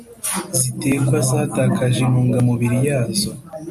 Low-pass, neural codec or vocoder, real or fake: 14.4 kHz; none; real